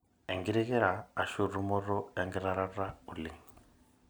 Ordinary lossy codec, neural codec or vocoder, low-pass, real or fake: none; none; none; real